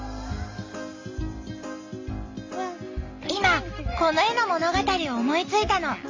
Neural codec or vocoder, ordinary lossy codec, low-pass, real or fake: none; AAC, 48 kbps; 7.2 kHz; real